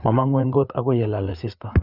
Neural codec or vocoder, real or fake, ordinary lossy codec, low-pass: codec, 16 kHz, 4 kbps, FreqCodec, larger model; fake; none; 5.4 kHz